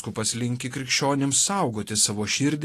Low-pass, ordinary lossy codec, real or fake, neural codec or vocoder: 14.4 kHz; AAC, 48 kbps; real; none